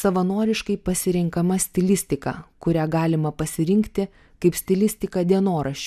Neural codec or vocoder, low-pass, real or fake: none; 14.4 kHz; real